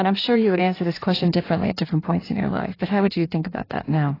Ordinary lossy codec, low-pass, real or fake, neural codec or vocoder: AAC, 24 kbps; 5.4 kHz; fake; codec, 16 kHz in and 24 kHz out, 1.1 kbps, FireRedTTS-2 codec